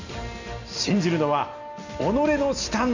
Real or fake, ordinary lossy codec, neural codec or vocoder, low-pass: real; none; none; 7.2 kHz